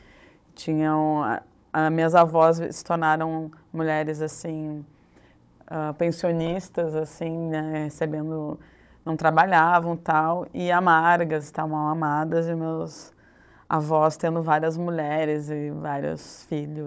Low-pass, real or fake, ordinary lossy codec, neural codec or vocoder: none; fake; none; codec, 16 kHz, 16 kbps, FunCodec, trained on Chinese and English, 50 frames a second